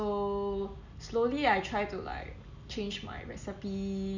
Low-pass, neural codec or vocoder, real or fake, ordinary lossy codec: 7.2 kHz; none; real; none